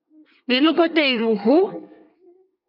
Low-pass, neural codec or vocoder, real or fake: 5.4 kHz; codec, 24 kHz, 1 kbps, SNAC; fake